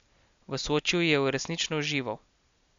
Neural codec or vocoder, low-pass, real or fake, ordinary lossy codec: none; 7.2 kHz; real; MP3, 64 kbps